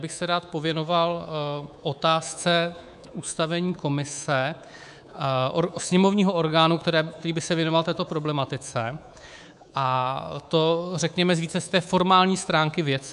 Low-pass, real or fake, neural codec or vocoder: 10.8 kHz; fake; codec, 24 kHz, 3.1 kbps, DualCodec